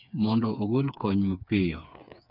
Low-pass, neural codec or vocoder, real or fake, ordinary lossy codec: 5.4 kHz; codec, 16 kHz, 4 kbps, FreqCodec, smaller model; fake; none